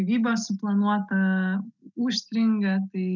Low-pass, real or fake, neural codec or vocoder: 7.2 kHz; real; none